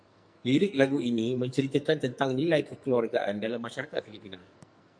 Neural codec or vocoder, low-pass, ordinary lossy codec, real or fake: codec, 44.1 kHz, 2.6 kbps, SNAC; 9.9 kHz; MP3, 64 kbps; fake